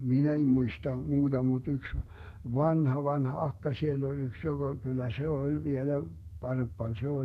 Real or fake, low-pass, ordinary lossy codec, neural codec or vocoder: fake; 14.4 kHz; none; codec, 32 kHz, 1.9 kbps, SNAC